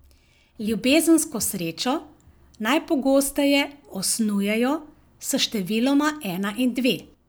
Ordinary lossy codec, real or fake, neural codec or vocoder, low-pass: none; real; none; none